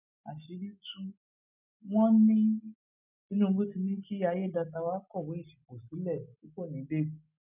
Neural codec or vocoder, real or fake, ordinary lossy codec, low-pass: none; real; none; 3.6 kHz